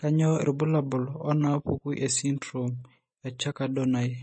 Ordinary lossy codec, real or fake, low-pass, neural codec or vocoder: MP3, 32 kbps; real; 9.9 kHz; none